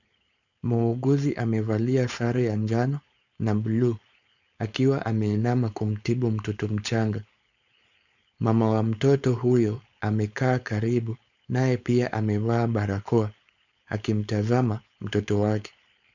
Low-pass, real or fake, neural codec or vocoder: 7.2 kHz; fake; codec, 16 kHz, 4.8 kbps, FACodec